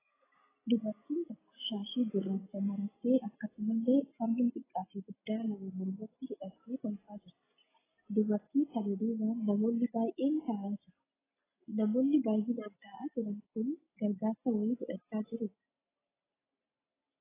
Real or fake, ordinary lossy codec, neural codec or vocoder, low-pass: real; AAC, 16 kbps; none; 3.6 kHz